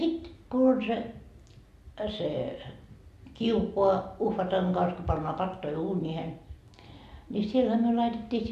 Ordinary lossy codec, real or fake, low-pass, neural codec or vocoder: MP3, 64 kbps; real; 14.4 kHz; none